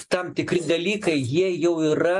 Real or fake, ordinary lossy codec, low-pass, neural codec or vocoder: real; MP3, 64 kbps; 10.8 kHz; none